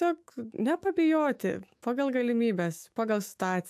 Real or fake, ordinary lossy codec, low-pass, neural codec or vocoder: fake; AAC, 96 kbps; 14.4 kHz; autoencoder, 48 kHz, 128 numbers a frame, DAC-VAE, trained on Japanese speech